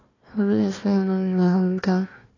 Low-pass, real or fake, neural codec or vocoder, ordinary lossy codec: 7.2 kHz; fake; codec, 16 kHz, 1 kbps, FunCodec, trained on Chinese and English, 50 frames a second; AAC, 32 kbps